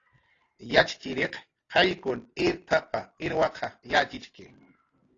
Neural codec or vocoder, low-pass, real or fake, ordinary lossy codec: none; 7.2 kHz; real; AAC, 32 kbps